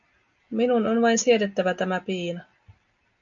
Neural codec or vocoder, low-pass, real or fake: none; 7.2 kHz; real